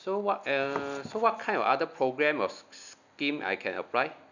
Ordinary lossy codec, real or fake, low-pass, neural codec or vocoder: none; real; 7.2 kHz; none